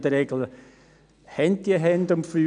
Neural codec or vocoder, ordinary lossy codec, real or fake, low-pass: none; none; real; 9.9 kHz